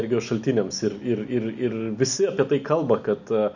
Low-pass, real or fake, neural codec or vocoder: 7.2 kHz; real; none